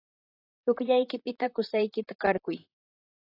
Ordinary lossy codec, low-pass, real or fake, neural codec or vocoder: AAC, 24 kbps; 5.4 kHz; fake; vocoder, 44.1 kHz, 128 mel bands, Pupu-Vocoder